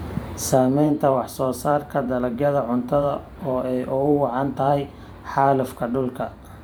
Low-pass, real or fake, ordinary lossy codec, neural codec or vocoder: none; fake; none; vocoder, 44.1 kHz, 128 mel bands every 512 samples, BigVGAN v2